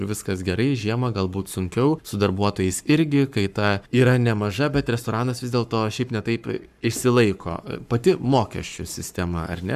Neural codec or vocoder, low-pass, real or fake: codec, 44.1 kHz, 7.8 kbps, Pupu-Codec; 14.4 kHz; fake